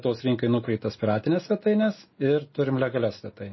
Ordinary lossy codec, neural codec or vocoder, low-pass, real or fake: MP3, 24 kbps; none; 7.2 kHz; real